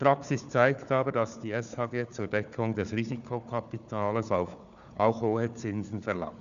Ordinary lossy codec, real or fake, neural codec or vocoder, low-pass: none; fake; codec, 16 kHz, 4 kbps, FunCodec, trained on Chinese and English, 50 frames a second; 7.2 kHz